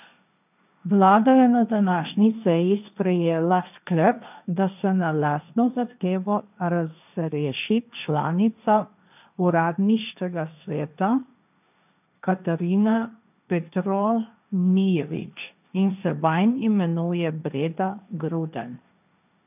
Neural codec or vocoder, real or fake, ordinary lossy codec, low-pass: codec, 16 kHz, 1.1 kbps, Voila-Tokenizer; fake; none; 3.6 kHz